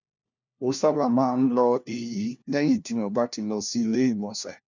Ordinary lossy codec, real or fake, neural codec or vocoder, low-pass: none; fake; codec, 16 kHz, 1 kbps, FunCodec, trained on LibriTTS, 50 frames a second; 7.2 kHz